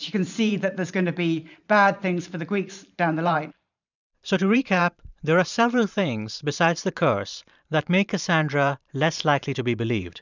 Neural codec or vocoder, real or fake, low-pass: vocoder, 44.1 kHz, 128 mel bands every 512 samples, BigVGAN v2; fake; 7.2 kHz